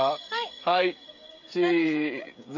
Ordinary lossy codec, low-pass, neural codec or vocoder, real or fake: none; 7.2 kHz; codec, 16 kHz, 16 kbps, FreqCodec, smaller model; fake